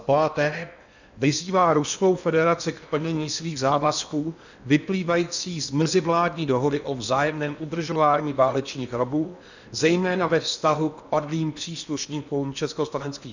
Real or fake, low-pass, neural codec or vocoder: fake; 7.2 kHz; codec, 16 kHz in and 24 kHz out, 0.8 kbps, FocalCodec, streaming, 65536 codes